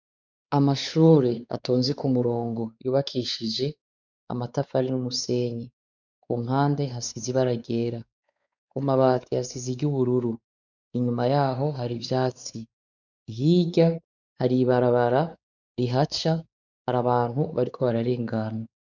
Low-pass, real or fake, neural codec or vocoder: 7.2 kHz; fake; codec, 16 kHz, 4 kbps, X-Codec, WavLM features, trained on Multilingual LibriSpeech